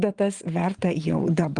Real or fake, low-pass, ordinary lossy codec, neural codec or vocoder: fake; 9.9 kHz; Opus, 24 kbps; vocoder, 22.05 kHz, 80 mel bands, WaveNeXt